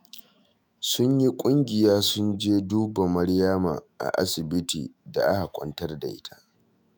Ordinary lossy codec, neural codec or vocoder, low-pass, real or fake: none; autoencoder, 48 kHz, 128 numbers a frame, DAC-VAE, trained on Japanese speech; none; fake